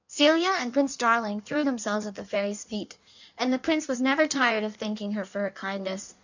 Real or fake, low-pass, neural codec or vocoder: fake; 7.2 kHz; codec, 16 kHz in and 24 kHz out, 1.1 kbps, FireRedTTS-2 codec